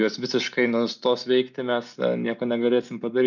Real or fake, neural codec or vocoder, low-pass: real; none; 7.2 kHz